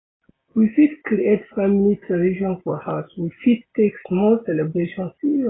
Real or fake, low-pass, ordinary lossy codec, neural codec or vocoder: real; 7.2 kHz; AAC, 16 kbps; none